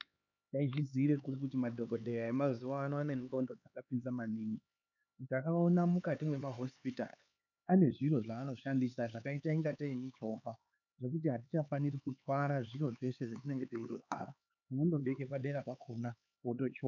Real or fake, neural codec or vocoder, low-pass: fake; codec, 16 kHz, 4 kbps, X-Codec, HuBERT features, trained on LibriSpeech; 7.2 kHz